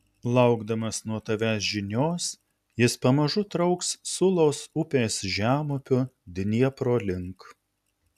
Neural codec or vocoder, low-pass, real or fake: none; 14.4 kHz; real